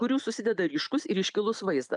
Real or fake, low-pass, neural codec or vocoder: real; 10.8 kHz; none